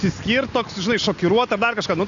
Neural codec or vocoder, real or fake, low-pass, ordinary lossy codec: none; real; 7.2 kHz; AAC, 48 kbps